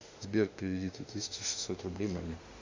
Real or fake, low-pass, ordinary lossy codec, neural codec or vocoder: fake; 7.2 kHz; AAC, 48 kbps; autoencoder, 48 kHz, 32 numbers a frame, DAC-VAE, trained on Japanese speech